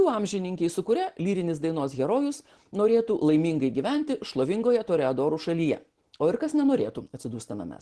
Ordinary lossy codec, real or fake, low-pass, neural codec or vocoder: Opus, 16 kbps; real; 10.8 kHz; none